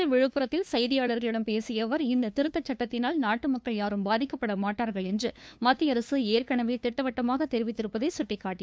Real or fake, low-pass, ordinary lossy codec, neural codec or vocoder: fake; none; none; codec, 16 kHz, 2 kbps, FunCodec, trained on LibriTTS, 25 frames a second